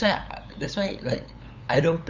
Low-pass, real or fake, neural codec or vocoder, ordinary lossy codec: 7.2 kHz; fake; codec, 16 kHz, 8 kbps, FunCodec, trained on LibriTTS, 25 frames a second; none